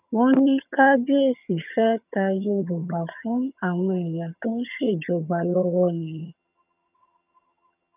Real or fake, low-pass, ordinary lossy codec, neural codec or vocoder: fake; 3.6 kHz; none; vocoder, 22.05 kHz, 80 mel bands, HiFi-GAN